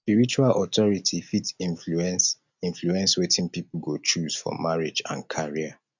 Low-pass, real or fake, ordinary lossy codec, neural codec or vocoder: 7.2 kHz; real; none; none